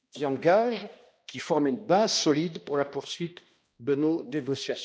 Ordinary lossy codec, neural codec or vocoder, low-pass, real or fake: none; codec, 16 kHz, 1 kbps, X-Codec, HuBERT features, trained on balanced general audio; none; fake